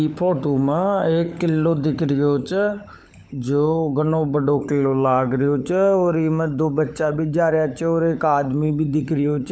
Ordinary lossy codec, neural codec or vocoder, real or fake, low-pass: none; codec, 16 kHz, 16 kbps, FunCodec, trained on LibriTTS, 50 frames a second; fake; none